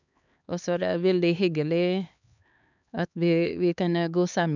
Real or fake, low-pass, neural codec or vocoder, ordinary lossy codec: fake; 7.2 kHz; codec, 16 kHz, 4 kbps, X-Codec, HuBERT features, trained on LibriSpeech; none